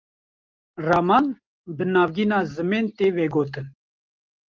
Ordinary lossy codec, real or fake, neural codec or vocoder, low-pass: Opus, 24 kbps; real; none; 7.2 kHz